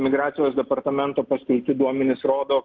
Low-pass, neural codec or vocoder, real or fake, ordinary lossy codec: 7.2 kHz; none; real; Opus, 32 kbps